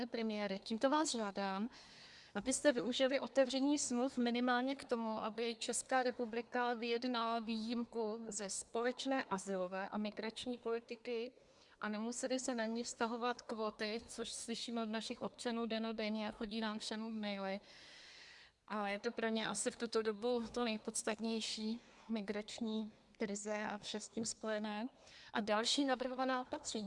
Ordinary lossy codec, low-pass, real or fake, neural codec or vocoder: Opus, 64 kbps; 10.8 kHz; fake; codec, 24 kHz, 1 kbps, SNAC